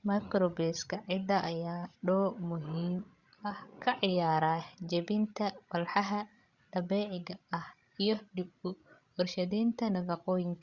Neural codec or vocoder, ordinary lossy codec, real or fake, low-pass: codec, 16 kHz, 16 kbps, FreqCodec, larger model; Opus, 64 kbps; fake; 7.2 kHz